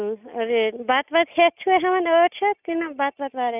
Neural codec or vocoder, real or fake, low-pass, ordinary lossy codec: none; real; 3.6 kHz; none